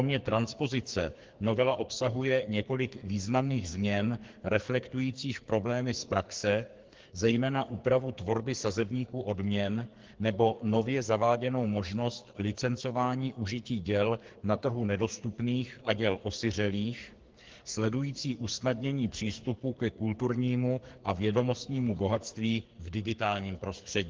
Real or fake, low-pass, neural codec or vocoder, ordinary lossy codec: fake; 7.2 kHz; codec, 44.1 kHz, 2.6 kbps, SNAC; Opus, 16 kbps